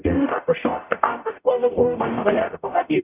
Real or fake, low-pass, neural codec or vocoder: fake; 3.6 kHz; codec, 44.1 kHz, 0.9 kbps, DAC